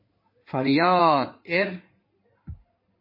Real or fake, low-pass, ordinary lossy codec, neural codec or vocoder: fake; 5.4 kHz; MP3, 24 kbps; codec, 16 kHz in and 24 kHz out, 2.2 kbps, FireRedTTS-2 codec